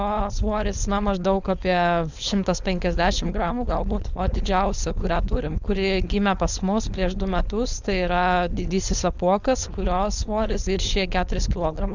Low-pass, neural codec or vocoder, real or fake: 7.2 kHz; codec, 16 kHz, 4.8 kbps, FACodec; fake